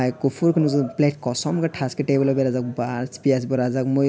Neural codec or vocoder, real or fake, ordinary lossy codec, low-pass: none; real; none; none